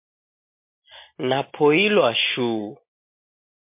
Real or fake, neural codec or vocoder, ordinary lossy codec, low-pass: real; none; MP3, 24 kbps; 3.6 kHz